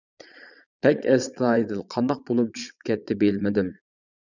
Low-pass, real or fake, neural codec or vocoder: 7.2 kHz; fake; vocoder, 22.05 kHz, 80 mel bands, Vocos